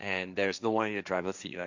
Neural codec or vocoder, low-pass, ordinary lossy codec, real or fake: codec, 16 kHz, 1.1 kbps, Voila-Tokenizer; 7.2 kHz; none; fake